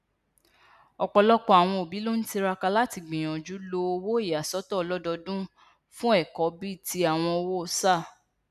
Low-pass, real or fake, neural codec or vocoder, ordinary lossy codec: 14.4 kHz; real; none; none